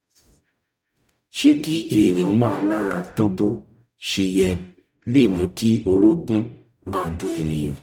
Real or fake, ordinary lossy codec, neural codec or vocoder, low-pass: fake; none; codec, 44.1 kHz, 0.9 kbps, DAC; 19.8 kHz